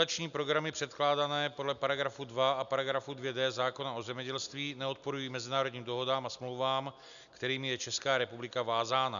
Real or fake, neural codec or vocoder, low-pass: real; none; 7.2 kHz